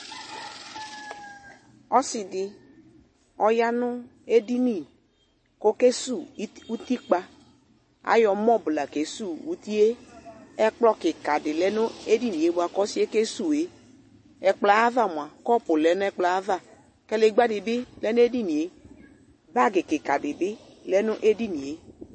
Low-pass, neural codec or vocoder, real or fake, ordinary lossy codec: 9.9 kHz; none; real; MP3, 32 kbps